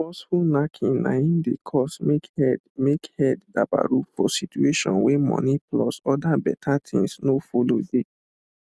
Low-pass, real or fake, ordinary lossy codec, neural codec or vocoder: none; real; none; none